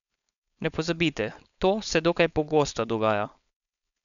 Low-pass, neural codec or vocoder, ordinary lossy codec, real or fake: 7.2 kHz; codec, 16 kHz, 4.8 kbps, FACodec; none; fake